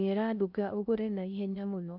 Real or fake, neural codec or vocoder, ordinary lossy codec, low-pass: fake; codec, 16 kHz in and 24 kHz out, 0.6 kbps, FocalCodec, streaming, 4096 codes; none; 5.4 kHz